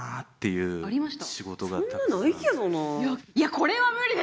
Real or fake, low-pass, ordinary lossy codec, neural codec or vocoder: real; none; none; none